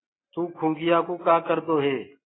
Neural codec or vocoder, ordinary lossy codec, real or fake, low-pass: none; AAC, 16 kbps; real; 7.2 kHz